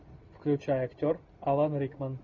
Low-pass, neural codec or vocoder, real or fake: 7.2 kHz; none; real